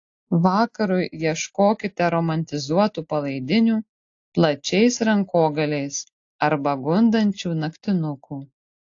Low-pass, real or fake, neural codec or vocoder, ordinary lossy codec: 7.2 kHz; real; none; AAC, 48 kbps